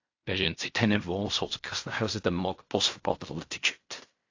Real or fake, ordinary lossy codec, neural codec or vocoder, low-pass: fake; AAC, 48 kbps; codec, 16 kHz in and 24 kHz out, 0.4 kbps, LongCat-Audio-Codec, fine tuned four codebook decoder; 7.2 kHz